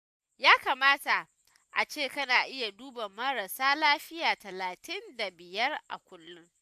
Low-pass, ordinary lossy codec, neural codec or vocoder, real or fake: 14.4 kHz; AAC, 96 kbps; none; real